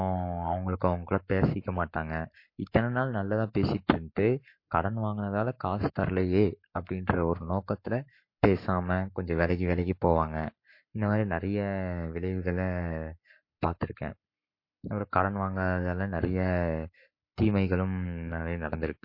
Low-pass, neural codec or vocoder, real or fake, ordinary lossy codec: 5.4 kHz; codec, 44.1 kHz, 7.8 kbps, Pupu-Codec; fake; MP3, 32 kbps